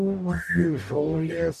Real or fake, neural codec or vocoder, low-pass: fake; codec, 44.1 kHz, 0.9 kbps, DAC; 14.4 kHz